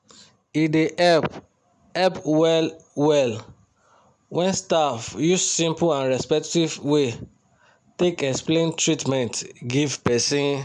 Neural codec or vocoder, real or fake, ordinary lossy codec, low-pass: none; real; none; 10.8 kHz